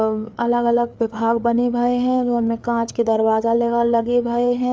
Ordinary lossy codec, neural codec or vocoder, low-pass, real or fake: none; codec, 16 kHz, 4 kbps, FreqCodec, larger model; none; fake